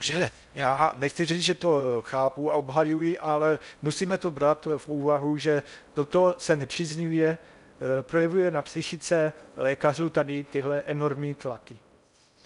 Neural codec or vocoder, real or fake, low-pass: codec, 16 kHz in and 24 kHz out, 0.6 kbps, FocalCodec, streaming, 4096 codes; fake; 10.8 kHz